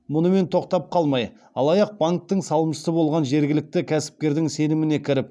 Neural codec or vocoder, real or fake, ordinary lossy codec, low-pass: none; real; none; none